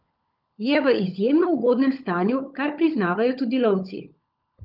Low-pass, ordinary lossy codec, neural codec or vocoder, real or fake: 5.4 kHz; Opus, 24 kbps; codec, 16 kHz, 16 kbps, FunCodec, trained on LibriTTS, 50 frames a second; fake